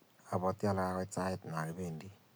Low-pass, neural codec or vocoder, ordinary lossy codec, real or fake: none; none; none; real